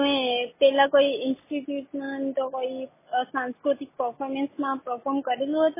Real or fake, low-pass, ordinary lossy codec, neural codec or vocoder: real; 3.6 kHz; MP3, 16 kbps; none